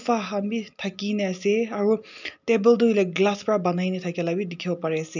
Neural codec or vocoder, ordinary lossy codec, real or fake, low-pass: none; none; real; 7.2 kHz